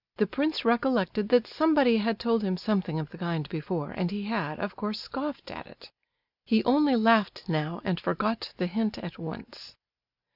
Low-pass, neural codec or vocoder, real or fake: 5.4 kHz; none; real